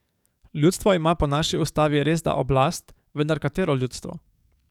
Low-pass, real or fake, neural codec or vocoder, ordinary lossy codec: 19.8 kHz; fake; codec, 44.1 kHz, 7.8 kbps, DAC; none